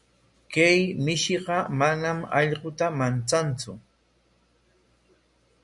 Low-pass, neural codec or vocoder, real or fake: 10.8 kHz; none; real